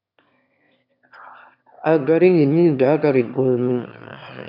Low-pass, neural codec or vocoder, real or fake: 5.4 kHz; autoencoder, 22.05 kHz, a latent of 192 numbers a frame, VITS, trained on one speaker; fake